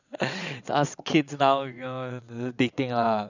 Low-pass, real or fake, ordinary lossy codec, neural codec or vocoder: 7.2 kHz; fake; none; vocoder, 22.05 kHz, 80 mel bands, WaveNeXt